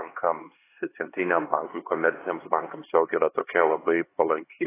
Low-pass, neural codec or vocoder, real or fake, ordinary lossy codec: 3.6 kHz; codec, 16 kHz, 2 kbps, X-Codec, HuBERT features, trained on LibriSpeech; fake; AAC, 16 kbps